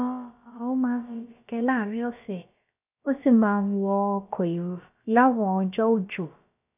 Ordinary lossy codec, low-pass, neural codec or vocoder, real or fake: none; 3.6 kHz; codec, 16 kHz, about 1 kbps, DyCAST, with the encoder's durations; fake